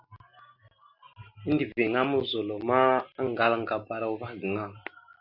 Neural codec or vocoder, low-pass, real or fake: none; 5.4 kHz; real